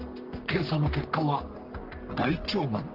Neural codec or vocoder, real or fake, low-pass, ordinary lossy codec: codec, 44.1 kHz, 3.4 kbps, Pupu-Codec; fake; 5.4 kHz; Opus, 16 kbps